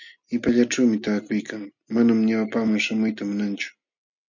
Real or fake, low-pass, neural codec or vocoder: real; 7.2 kHz; none